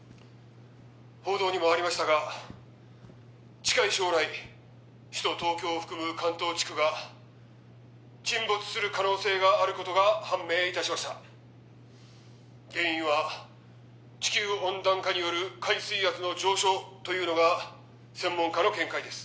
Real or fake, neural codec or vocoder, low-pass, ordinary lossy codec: real; none; none; none